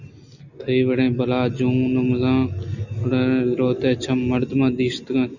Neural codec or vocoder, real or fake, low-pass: none; real; 7.2 kHz